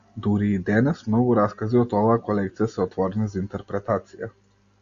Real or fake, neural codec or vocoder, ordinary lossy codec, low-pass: real; none; Opus, 64 kbps; 7.2 kHz